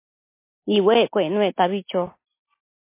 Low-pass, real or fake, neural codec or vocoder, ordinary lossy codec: 3.6 kHz; real; none; MP3, 24 kbps